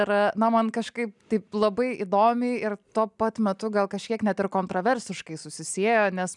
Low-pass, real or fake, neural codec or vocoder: 10.8 kHz; real; none